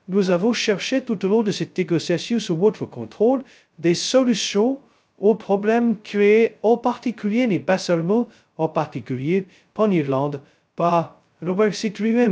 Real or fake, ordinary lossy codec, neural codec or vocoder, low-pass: fake; none; codec, 16 kHz, 0.2 kbps, FocalCodec; none